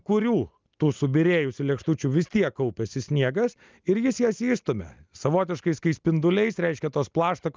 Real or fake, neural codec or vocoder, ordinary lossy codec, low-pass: real; none; Opus, 24 kbps; 7.2 kHz